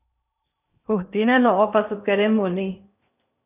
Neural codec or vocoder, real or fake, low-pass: codec, 16 kHz in and 24 kHz out, 0.8 kbps, FocalCodec, streaming, 65536 codes; fake; 3.6 kHz